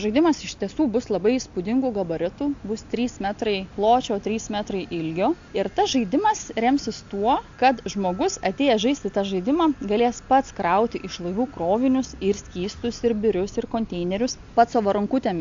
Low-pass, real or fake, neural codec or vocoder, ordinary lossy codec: 7.2 kHz; real; none; AAC, 64 kbps